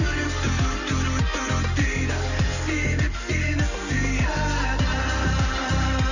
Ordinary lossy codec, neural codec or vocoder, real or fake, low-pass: none; none; real; 7.2 kHz